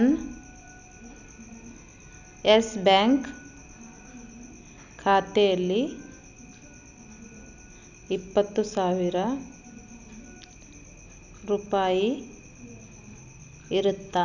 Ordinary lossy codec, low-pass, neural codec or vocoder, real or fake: none; 7.2 kHz; none; real